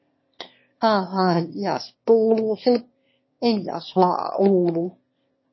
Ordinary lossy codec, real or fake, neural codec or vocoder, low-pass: MP3, 24 kbps; fake; autoencoder, 22.05 kHz, a latent of 192 numbers a frame, VITS, trained on one speaker; 7.2 kHz